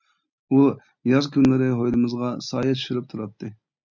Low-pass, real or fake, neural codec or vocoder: 7.2 kHz; real; none